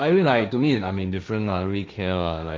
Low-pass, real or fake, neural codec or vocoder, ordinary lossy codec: none; fake; codec, 16 kHz, 1.1 kbps, Voila-Tokenizer; none